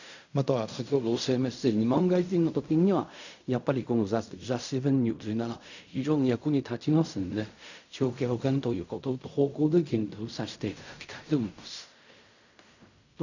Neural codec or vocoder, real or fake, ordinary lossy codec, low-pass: codec, 16 kHz in and 24 kHz out, 0.4 kbps, LongCat-Audio-Codec, fine tuned four codebook decoder; fake; none; 7.2 kHz